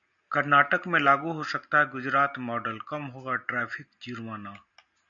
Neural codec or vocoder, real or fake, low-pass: none; real; 7.2 kHz